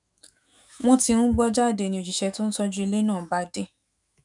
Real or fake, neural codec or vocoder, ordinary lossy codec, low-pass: fake; autoencoder, 48 kHz, 128 numbers a frame, DAC-VAE, trained on Japanese speech; none; 10.8 kHz